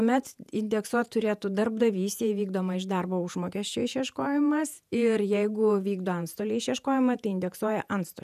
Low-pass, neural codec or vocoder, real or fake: 14.4 kHz; vocoder, 48 kHz, 128 mel bands, Vocos; fake